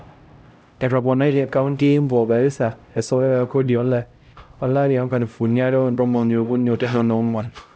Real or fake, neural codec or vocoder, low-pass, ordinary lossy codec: fake; codec, 16 kHz, 0.5 kbps, X-Codec, HuBERT features, trained on LibriSpeech; none; none